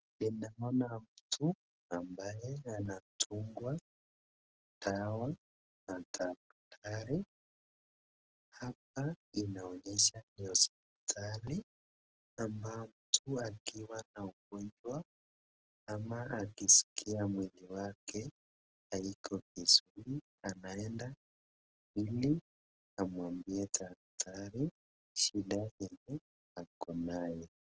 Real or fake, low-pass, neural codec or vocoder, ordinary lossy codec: real; 7.2 kHz; none; Opus, 16 kbps